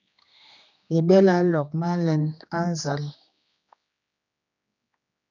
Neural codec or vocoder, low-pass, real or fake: codec, 16 kHz, 2 kbps, X-Codec, HuBERT features, trained on general audio; 7.2 kHz; fake